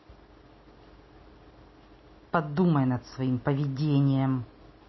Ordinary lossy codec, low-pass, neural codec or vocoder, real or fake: MP3, 24 kbps; 7.2 kHz; none; real